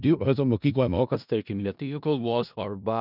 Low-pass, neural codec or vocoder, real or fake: 5.4 kHz; codec, 16 kHz in and 24 kHz out, 0.4 kbps, LongCat-Audio-Codec, four codebook decoder; fake